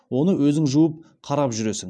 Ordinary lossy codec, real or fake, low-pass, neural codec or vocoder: none; real; none; none